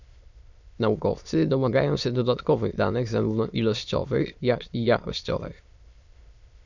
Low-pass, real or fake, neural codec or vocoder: 7.2 kHz; fake; autoencoder, 22.05 kHz, a latent of 192 numbers a frame, VITS, trained on many speakers